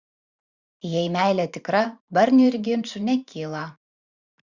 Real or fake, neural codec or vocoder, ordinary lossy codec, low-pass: fake; vocoder, 44.1 kHz, 128 mel bands every 512 samples, BigVGAN v2; Opus, 64 kbps; 7.2 kHz